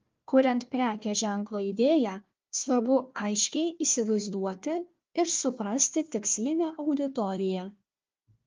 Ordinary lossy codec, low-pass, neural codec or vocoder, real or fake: Opus, 24 kbps; 7.2 kHz; codec, 16 kHz, 1 kbps, FunCodec, trained on Chinese and English, 50 frames a second; fake